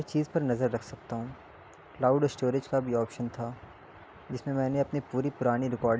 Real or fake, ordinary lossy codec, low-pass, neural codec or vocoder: real; none; none; none